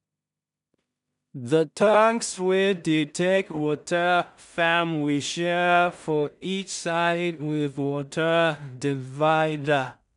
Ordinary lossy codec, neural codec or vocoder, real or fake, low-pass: none; codec, 16 kHz in and 24 kHz out, 0.4 kbps, LongCat-Audio-Codec, two codebook decoder; fake; 10.8 kHz